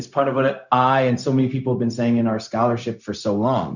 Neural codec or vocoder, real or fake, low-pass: codec, 16 kHz, 0.4 kbps, LongCat-Audio-Codec; fake; 7.2 kHz